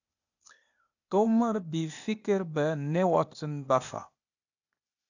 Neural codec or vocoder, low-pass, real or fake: codec, 16 kHz, 0.8 kbps, ZipCodec; 7.2 kHz; fake